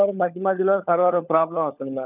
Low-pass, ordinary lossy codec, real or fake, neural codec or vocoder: 3.6 kHz; none; fake; codec, 16 kHz, 16 kbps, FunCodec, trained on LibriTTS, 50 frames a second